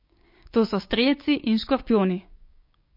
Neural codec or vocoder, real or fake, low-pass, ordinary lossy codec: codec, 16 kHz, 6 kbps, DAC; fake; 5.4 kHz; MP3, 32 kbps